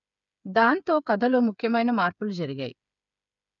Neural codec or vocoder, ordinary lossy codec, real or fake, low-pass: codec, 16 kHz, 8 kbps, FreqCodec, smaller model; none; fake; 7.2 kHz